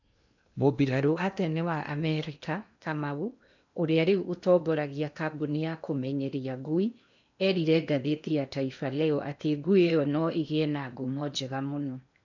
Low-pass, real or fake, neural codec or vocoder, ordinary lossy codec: 7.2 kHz; fake; codec, 16 kHz in and 24 kHz out, 0.8 kbps, FocalCodec, streaming, 65536 codes; none